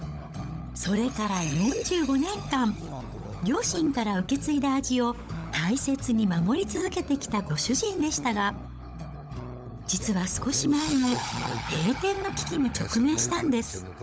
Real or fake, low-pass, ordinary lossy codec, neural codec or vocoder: fake; none; none; codec, 16 kHz, 16 kbps, FunCodec, trained on LibriTTS, 50 frames a second